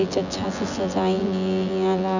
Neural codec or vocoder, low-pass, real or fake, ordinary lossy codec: vocoder, 24 kHz, 100 mel bands, Vocos; 7.2 kHz; fake; none